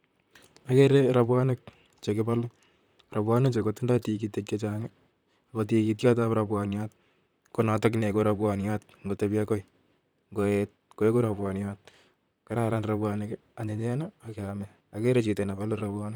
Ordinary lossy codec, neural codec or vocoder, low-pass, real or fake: none; vocoder, 44.1 kHz, 128 mel bands, Pupu-Vocoder; none; fake